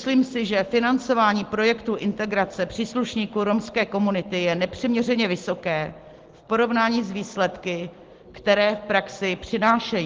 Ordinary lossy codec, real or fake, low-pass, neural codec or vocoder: Opus, 16 kbps; real; 7.2 kHz; none